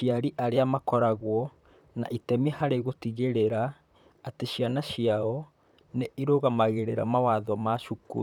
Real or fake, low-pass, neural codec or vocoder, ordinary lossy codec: fake; 19.8 kHz; vocoder, 44.1 kHz, 128 mel bands, Pupu-Vocoder; none